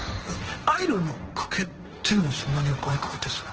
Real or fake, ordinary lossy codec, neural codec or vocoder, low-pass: fake; Opus, 16 kbps; codec, 16 kHz, 1.1 kbps, Voila-Tokenizer; 7.2 kHz